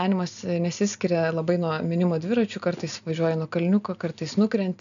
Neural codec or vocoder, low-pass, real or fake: none; 7.2 kHz; real